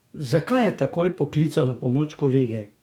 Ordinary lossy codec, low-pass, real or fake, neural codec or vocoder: none; 19.8 kHz; fake; codec, 44.1 kHz, 2.6 kbps, DAC